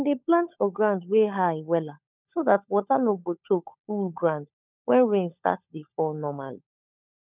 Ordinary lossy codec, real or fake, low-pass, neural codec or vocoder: none; fake; 3.6 kHz; codec, 16 kHz, 16 kbps, FunCodec, trained on LibriTTS, 50 frames a second